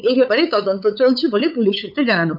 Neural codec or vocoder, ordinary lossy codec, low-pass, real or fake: codec, 16 kHz, 8 kbps, FunCodec, trained on LibriTTS, 25 frames a second; none; 5.4 kHz; fake